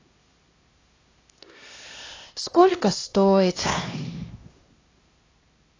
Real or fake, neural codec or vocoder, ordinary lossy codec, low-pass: fake; codec, 16 kHz, 1 kbps, X-Codec, HuBERT features, trained on LibriSpeech; AAC, 32 kbps; 7.2 kHz